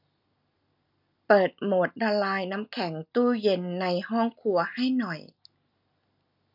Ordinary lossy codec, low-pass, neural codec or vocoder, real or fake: none; 5.4 kHz; none; real